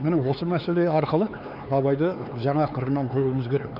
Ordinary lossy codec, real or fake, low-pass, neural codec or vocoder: none; fake; 5.4 kHz; codec, 16 kHz, 4 kbps, X-Codec, HuBERT features, trained on LibriSpeech